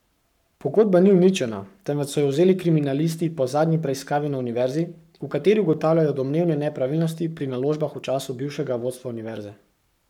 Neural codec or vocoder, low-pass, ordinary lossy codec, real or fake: codec, 44.1 kHz, 7.8 kbps, Pupu-Codec; 19.8 kHz; none; fake